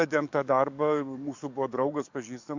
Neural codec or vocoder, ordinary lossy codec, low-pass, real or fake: codec, 44.1 kHz, 7.8 kbps, DAC; MP3, 64 kbps; 7.2 kHz; fake